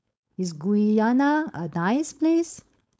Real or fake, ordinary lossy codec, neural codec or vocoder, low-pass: fake; none; codec, 16 kHz, 4.8 kbps, FACodec; none